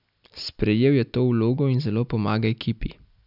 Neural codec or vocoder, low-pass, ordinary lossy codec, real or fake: none; 5.4 kHz; none; real